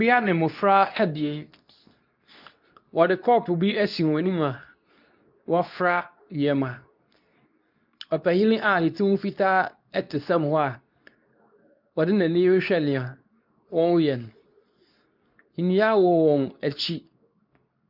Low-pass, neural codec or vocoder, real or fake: 5.4 kHz; codec, 24 kHz, 0.9 kbps, WavTokenizer, medium speech release version 2; fake